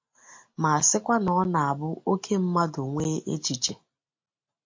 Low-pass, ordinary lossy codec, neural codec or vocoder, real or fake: 7.2 kHz; MP3, 48 kbps; none; real